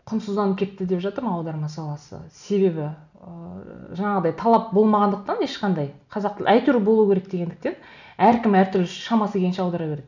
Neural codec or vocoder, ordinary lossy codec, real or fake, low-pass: none; none; real; 7.2 kHz